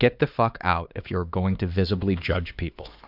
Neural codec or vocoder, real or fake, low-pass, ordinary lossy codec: codec, 16 kHz, 2 kbps, X-Codec, HuBERT features, trained on LibriSpeech; fake; 5.4 kHz; Opus, 64 kbps